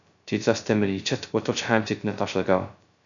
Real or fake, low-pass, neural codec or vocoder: fake; 7.2 kHz; codec, 16 kHz, 0.2 kbps, FocalCodec